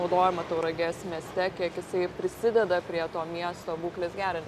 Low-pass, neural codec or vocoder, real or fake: 14.4 kHz; none; real